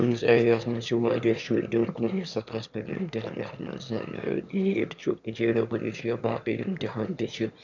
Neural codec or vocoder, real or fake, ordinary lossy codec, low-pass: autoencoder, 22.05 kHz, a latent of 192 numbers a frame, VITS, trained on one speaker; fake; none; 7.2 kHz